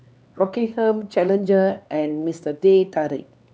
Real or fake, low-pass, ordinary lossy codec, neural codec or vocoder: fake; none; none; codec, 16 kHz, 4 kbps, X-Codec, HuBERT features, trained on LibriSpeech